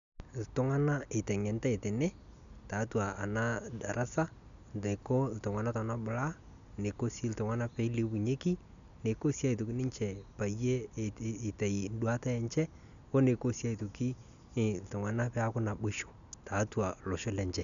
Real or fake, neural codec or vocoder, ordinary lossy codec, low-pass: real; none; none; 7.2 kHz